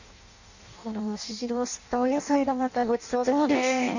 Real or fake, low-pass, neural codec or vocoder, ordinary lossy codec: fake; 7.2 kHz; codec, 16 kHz in and 24 kHz out, 0.6 kbps, FireRedTTS-2 codec; none